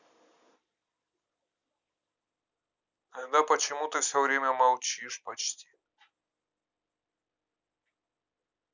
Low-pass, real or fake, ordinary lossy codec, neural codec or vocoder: 7.2 kHz; real; none; none